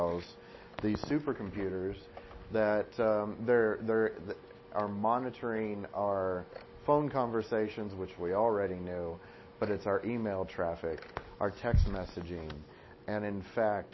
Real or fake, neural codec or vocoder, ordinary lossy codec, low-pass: real; none; MP3, 24 kbps; 7.2 kHz